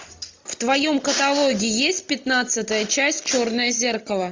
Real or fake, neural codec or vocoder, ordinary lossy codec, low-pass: real; none; MP3, 64 kbps; 7.2 kHz